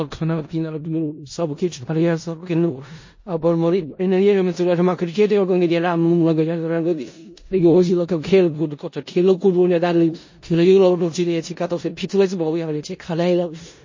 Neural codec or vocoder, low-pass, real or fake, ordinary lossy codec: codec, 16 kHz in and 24 kHz out, 0.4 kbps, LongCat-Audio-Codec, four codebook decoder; 7.2 kHz; fake; MP3, 32 kbps